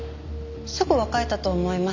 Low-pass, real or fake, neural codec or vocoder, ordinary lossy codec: 7.2 kHz; real; none; none